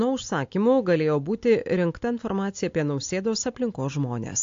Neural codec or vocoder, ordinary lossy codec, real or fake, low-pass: none; AAC, 48 kbps; real; 7.2 kHz